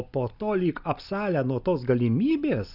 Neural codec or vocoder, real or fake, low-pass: none; real; 5.4 kHz